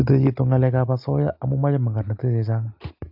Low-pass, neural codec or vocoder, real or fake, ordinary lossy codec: 5.4 kHz; none; real; AAC, 48 kbps